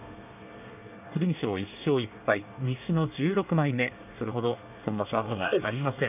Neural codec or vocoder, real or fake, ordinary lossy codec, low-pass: codec, 24 kHz, 1 kbps, SNAC; fake; none; 3.6 kHz